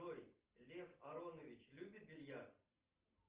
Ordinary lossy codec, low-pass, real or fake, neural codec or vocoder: Opus, 24 kbps; 3.6 kHz; real; none